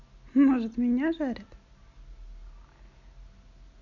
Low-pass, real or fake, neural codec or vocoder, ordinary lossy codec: 7.2 kHz; real; none; none